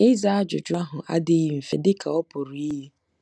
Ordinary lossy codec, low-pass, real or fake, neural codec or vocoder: none; none; real; none